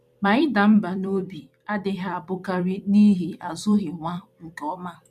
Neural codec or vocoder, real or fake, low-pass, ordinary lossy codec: vocoder, 44.1 kHz, 128 mel bands every 256 samples, BigVGAN v2; fake; 14.4 kHz; none